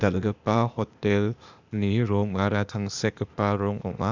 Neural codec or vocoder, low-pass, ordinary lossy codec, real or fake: codec, 16 kHz, 0.8 kbps, ZipCodec; 7.2 kHz; Opus, 64 kbps; fake